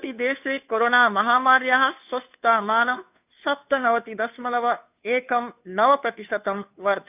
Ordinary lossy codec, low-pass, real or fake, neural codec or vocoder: none; 3.6 kHz; fake; codec, 16 kHz, 2 kbps, FunCodec, trained on Chinese and English, 25 frames a second